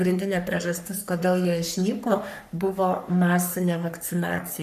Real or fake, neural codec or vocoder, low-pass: fake; codec, 44.1 kHz, 3.4 kbps, Pupu-Codec; 14.4 kHz